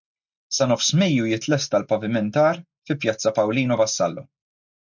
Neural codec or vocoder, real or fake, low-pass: none; real; 7.2 kHz